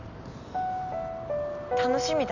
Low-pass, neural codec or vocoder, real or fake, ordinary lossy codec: 7.2 kHz; none; real; none